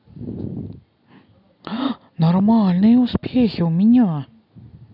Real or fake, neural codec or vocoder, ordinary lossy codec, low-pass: real; none; none; 5.4 kHz